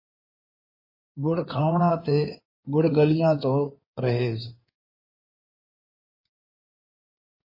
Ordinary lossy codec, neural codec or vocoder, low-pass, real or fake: MP3, 24 kbps; codec, 16 kHz in and 24 kHz out, 2.2 kbps, FireRedTTS-2 codec; 5.4 kHz; fake